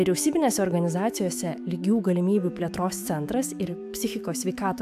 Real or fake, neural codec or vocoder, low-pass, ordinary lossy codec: fake; autoencoder, 48 kHz, 128 numbers a frame, DAC-VAE, trained on Japanese speech; 14.4 kHz; AAC, 96 kbps